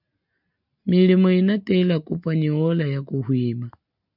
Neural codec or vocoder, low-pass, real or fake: none; 5.4 kHz; real